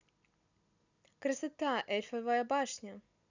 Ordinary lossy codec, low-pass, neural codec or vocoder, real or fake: none; 7.2 kHz; none; real